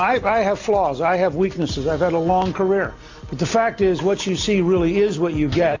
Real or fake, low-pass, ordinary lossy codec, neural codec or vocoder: real; 7.2 kHz; AAC, 48 kbps; none